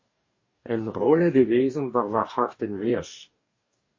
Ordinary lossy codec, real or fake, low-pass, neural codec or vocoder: MP3, 32 kbps; fake; 7.2 kHz; codec, 44.1 kHz, 2.6 kbps, DAC